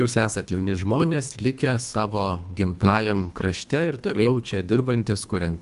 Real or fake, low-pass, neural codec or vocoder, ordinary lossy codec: fake; 10.8 kHz; codec, 24 kHz, 1.5 kbps, HILCodec; AAC, 96 kbps